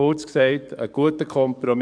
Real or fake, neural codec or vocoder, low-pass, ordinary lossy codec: fake; codec, 44.1 kHz, 7.8 kbps, DAC; 9.9 kHz; MP3, 96 kbps